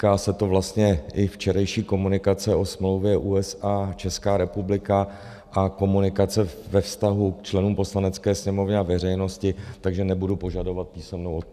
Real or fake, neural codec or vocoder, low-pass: real; none; 14.4 kHz